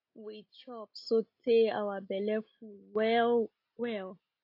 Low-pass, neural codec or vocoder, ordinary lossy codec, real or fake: 5.4 kHz; none; AAC, 32 kbps; real